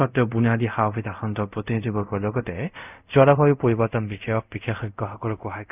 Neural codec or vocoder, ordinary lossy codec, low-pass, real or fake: codec, 24 kHz, 0.5 kbps, DualCodec; none; 3.6 kHz; fake